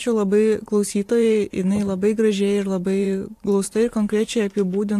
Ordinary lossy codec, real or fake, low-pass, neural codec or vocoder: AAC, 64 kbps; fake; 14.4 kHz; vocoder, 44.1 kHz, 128 mel bands every 512 samples, BigVGAN v2